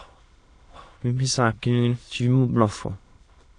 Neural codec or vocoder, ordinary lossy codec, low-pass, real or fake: autoencoder, 22.05 kHz, a latent of 192 numbers a frame, VITS, trained on many speakers; AAC, 48 kbps; 9.9 kHz; fake